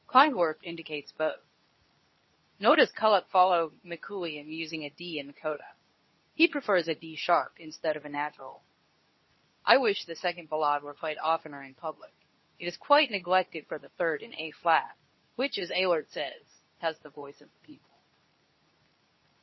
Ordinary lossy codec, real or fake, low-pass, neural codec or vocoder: MP3, 24 kbps; fake; 7.2 kHz; codec, 24 kHz, 0.9 kbps, WavTokenizer, medium speech release version 2